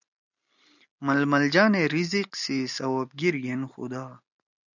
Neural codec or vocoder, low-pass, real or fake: none; 7.2 kHz; real